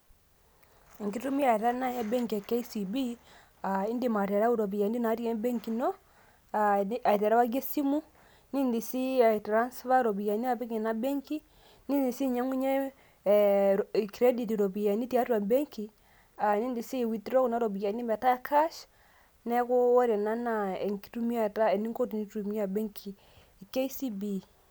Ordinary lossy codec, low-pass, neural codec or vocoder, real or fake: none; none; none; real